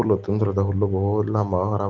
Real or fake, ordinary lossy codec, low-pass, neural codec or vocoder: real; Opus, 16 kbps; 7.2 kHz; none